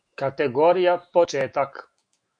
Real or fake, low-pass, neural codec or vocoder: fake; 9.9 kHz; autoencoder, 48 kHz, 128 numbers a frame, DAC-VAE, trained on Japanese speech